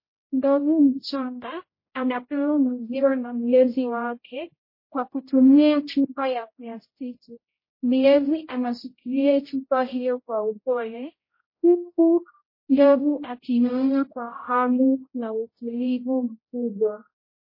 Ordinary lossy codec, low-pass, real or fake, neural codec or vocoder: MP3, 24 kbps; 5.4 kHz; fake; codec, 16 kHz, 0.5 kbps, X-Codec, HuBERT features, trained on general audio